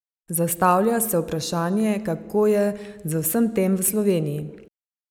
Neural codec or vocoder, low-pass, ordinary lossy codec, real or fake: none; none; none; real